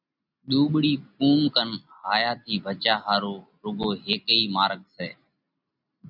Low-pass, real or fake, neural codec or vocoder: 5.4 kHz; real; none